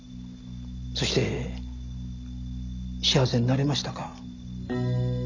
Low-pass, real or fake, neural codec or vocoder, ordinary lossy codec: 7.2 kHz; real; none; none